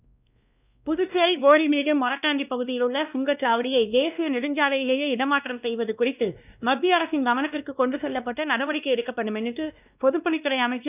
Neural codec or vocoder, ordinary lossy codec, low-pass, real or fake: codec, 16 kHz, 1 kbps, X-Codec, WavLM features, trained on Multilingual LibriSpeech; none; 3.6 kHz; fake